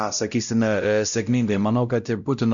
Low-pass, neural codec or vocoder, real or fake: 7.2 kHz; codec, 16 kHz, 0.5 kbps, X-Codec, WavLM features, trained on Multilingual LibriSpeech; fake